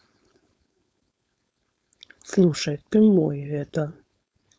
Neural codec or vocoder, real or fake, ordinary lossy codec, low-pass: codec, 16 kHz, 4.8 kbps, FACodec; fake; none; none